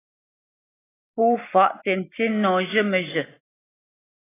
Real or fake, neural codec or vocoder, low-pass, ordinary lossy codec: real; none; 3.6 kHz; AAC, 16 kbps